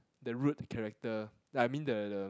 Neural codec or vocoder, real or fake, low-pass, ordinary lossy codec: none; real; none; none